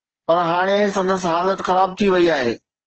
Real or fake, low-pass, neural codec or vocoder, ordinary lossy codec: fake; 7.2 kHz; codec, 16 kHz, 4 kbps, FreqCodec, smaller model; Opus, 16 kbps